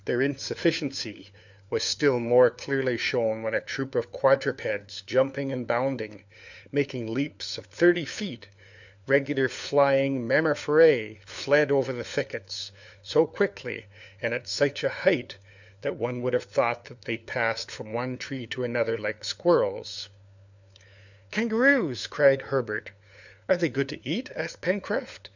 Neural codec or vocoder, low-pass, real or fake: codec, 16 kHz, 4 kbps, FunCodec, trained on LibriTTS, 50 frames a second; 7.2 kHz; fake